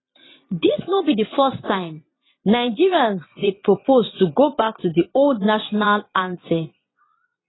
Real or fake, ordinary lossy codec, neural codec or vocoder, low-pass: fake; AAC, 16 kbps; vocoder, 22.05 kHz, 80 mel bands, Vocos; 7.2 kHz